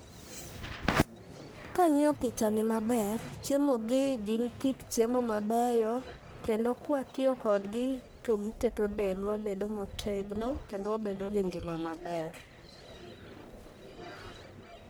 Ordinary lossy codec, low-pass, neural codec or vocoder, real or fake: none; none; codec, 44.1 kHz, 1.7 kbps, Pupu-Codec; fake